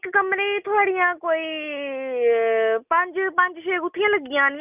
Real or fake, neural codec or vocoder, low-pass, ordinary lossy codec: real; none; 3.6 kHz; none